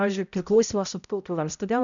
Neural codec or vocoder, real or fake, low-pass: codec, 16 kHz, 0.5 kbps, X-Codec, HuBERT features, trained on balanced general audio; fake; 7.2 kHz